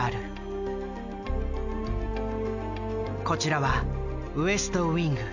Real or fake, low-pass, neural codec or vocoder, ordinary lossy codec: real; 7.2 kHz; none; none